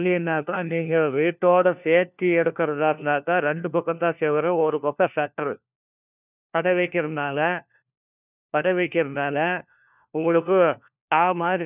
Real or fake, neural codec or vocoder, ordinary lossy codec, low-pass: fake; codec, 16 kHz, 1 kbps, FunCodec, trained on LibriTTS, 50 frames a second; none; 3.6 kHz